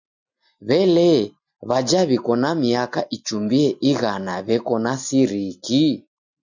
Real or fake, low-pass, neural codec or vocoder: real; 7.2 kHz; none